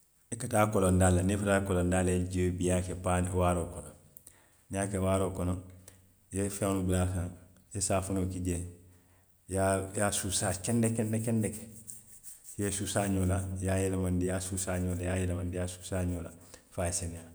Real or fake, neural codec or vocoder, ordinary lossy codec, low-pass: real; none; none; none